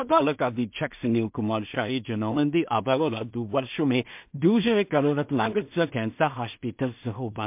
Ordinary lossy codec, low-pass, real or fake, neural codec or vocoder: MP3, 32 kbps; 3.6 kHz; fake; codec, 16 kHz in and 24 kHz out, 0.4 kbps, LongCat-Audio-Codec, two codebook decoder